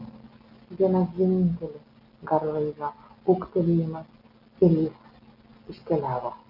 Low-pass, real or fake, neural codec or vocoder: 5.4 kHz; real; none